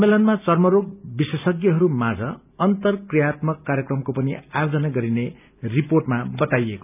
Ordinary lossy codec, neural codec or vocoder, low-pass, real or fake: none; none; 3.6 kHz; real